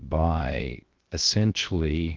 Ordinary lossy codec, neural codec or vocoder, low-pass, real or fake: Opus, 32 kbps; codec, 16 kHz, 0.7 kbps, FocalCodec; 7.2 kHz; fake